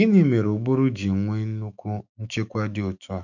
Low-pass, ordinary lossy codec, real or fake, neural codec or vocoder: 7.2 kHz; none; fake; autoencoder, 48 kHz, 128 numbers a frame, DAC-VAE, trained on Japanese speech